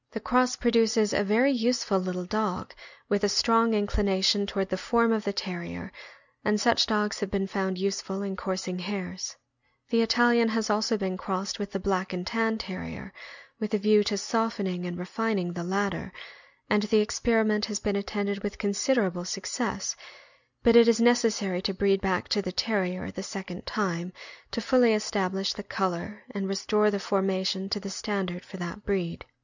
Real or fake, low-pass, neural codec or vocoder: real; 7.2 kHz; none